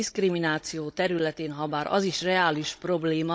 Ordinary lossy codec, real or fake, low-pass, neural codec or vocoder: none; fake; none; codec, 16 kHz, 16 kbps, FunCodec, trained on Chinese and English, 50 frames a second